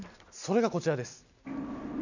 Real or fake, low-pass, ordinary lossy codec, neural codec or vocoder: real; 7.2 kHz; none; none